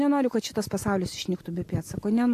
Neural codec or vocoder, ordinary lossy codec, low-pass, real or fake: none; AAC, 64 kbps; 14.4 kHz; real